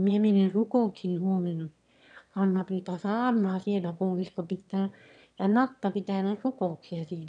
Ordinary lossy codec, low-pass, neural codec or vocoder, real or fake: AAC, 96 kbps; 9.9 kHz; autoencoder, 22.05 kHz, a latent of 192 numbers a frame, VITS, trained on one speaker; fake